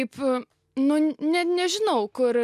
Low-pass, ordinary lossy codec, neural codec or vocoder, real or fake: 14.4 kHz; MP3, 96 kbps; none; real